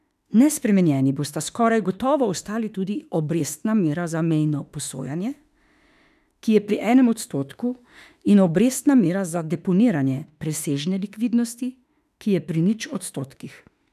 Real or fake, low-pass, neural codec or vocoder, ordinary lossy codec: fake; 14.4 kHz; autoencoder, 48 kHz, 32 numbers a frame, DAC-VAE, trained on Japanese speech; none